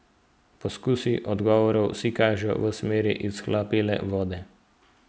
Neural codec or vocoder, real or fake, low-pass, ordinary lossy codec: none; real; none; none